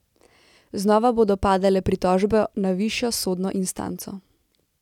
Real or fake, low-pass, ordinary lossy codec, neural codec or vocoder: real; 19.8 kHz; none; none